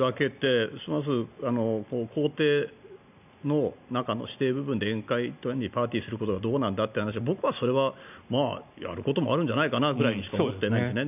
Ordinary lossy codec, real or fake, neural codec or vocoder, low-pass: none; real; none; 3.6 kHz